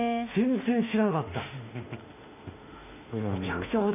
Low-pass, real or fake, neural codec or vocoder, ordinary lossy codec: 3.6 kHz; fake; autoencoder, 48 kHz, 32 numbers a frame, DAC-VAE, trained on Japanese speech; none